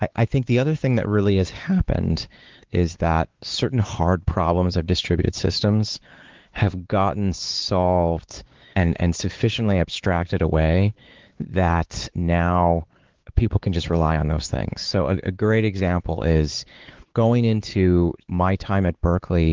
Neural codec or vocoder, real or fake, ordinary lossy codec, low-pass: codec, 16 kHz, 4 kbps, X-Codec, WavLM features, trained on Multilingual LibriSpeech; fake; Opus, 16 kbps; 7.2 kHz